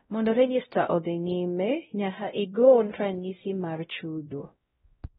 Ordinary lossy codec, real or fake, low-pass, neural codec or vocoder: AAC, 16 kbps; fake; 7.2 kHz; codec, 16 kHz, 0.5 kbps, X-Codec, WavLM features, trained on Multilingual LibriSpeech